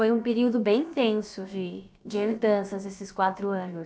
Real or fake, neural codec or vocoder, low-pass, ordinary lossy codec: fake; codec, 16 kHz, about 1 kbps, DyCAST, with the encoder's durations; none; none